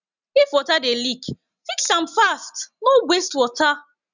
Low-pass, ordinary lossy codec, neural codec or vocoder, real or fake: 7.2 kHz; none; none; real